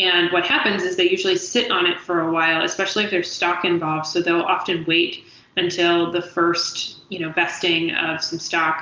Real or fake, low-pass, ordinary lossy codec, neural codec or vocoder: real; 7.2 kHz; Opus, 24 kbps; none